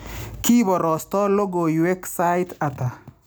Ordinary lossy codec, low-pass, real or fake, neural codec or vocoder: none; none; real; none